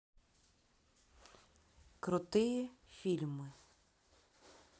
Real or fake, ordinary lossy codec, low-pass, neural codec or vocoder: real; none; none; none